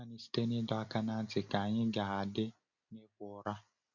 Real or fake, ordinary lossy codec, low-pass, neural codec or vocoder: real; none; 7.2 kHz; none